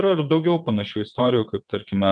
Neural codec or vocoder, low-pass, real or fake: vocoder, 22.05 kHz, 80 mel bands, WaveNeXt; 9.9 kHz; fake